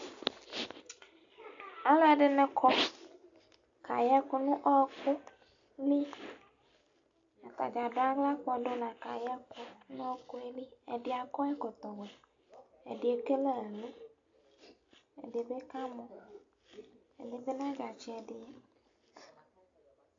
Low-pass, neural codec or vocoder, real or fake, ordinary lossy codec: 7.2 kHz; none; real; AAC, 64 kbps